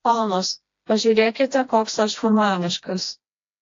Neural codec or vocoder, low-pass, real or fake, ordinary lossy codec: codec, 16 kHz, 1 kbps, FreqCodec, smaller model; 7.2 kHz; fake; AAC, 48 kbps